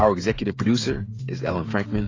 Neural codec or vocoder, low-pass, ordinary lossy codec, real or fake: codec, 16 kHz, 16 kbps, FreqCodec, smaller model; 7.2 kHz; AAC, 32 kbps; fake